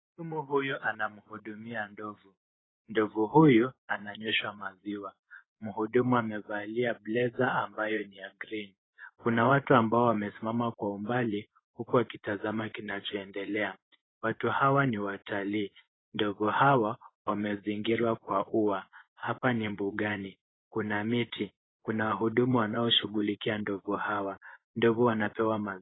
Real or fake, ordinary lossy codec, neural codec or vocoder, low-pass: fake; AAC, 16 kbps; vocoder, 24 kHz, 100 mel bands, Vocos; 7.2 kHz